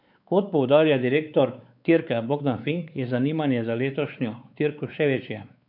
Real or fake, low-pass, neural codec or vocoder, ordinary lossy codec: fake; 5.4 kHz; codec, 16 kHz, 4 kbps, X-Codec, WavLM features, trained on Multilingual LibriSpeech; none